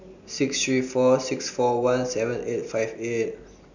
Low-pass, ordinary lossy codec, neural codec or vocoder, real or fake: 7.2 kHz; none; none; real